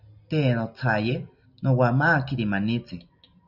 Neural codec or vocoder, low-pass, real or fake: none; 5.4 kHz; real